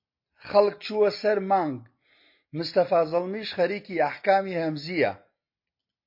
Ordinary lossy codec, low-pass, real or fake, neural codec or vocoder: MP3, 32 kbps; 5.4 kHz; real; none